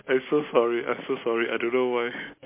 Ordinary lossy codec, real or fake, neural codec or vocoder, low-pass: MP3, 24 kbps; real; none; 3.6 kHz